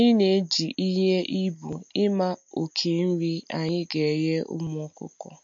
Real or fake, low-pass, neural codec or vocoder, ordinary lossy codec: real; 7.2 kHz; none; MP3, 48 kbps